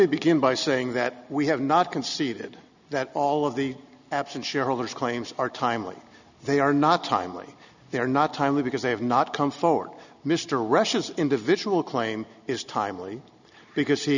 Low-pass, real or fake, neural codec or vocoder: 7.2 kHz; real; none